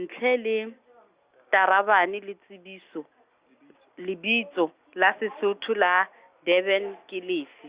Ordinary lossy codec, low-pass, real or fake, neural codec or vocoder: Opus, 64 kbps; 3.6 kHz; real; none